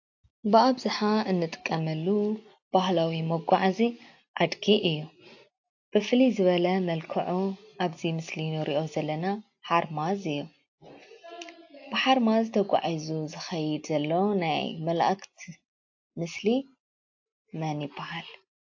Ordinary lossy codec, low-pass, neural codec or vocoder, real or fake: AAC, 48 kbps; 7.2 kHz; none; real